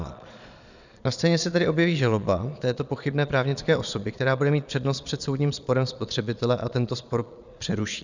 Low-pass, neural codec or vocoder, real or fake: 7.2 kHz; vocoder, 22.05 kHz, 80 mel bands, Vocos; fake